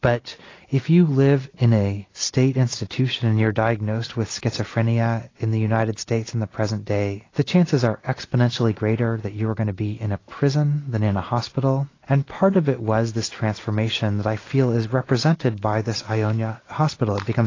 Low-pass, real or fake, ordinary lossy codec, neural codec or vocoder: 7.2 kHz; real; AAC, 32 kbps; none